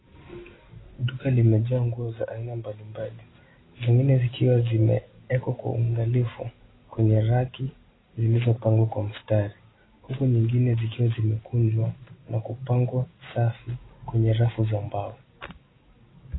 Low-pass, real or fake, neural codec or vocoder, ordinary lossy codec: 7.2 kHz; real; none; AAC, 16 kbps